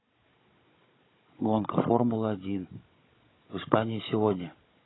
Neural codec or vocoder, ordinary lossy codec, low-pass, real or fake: codec, 16 kHz, 16 kbps, FunCodec, trained on Chinese and English, 50 frames a second; AAC, 16 kbps; 7.2 kHz; fake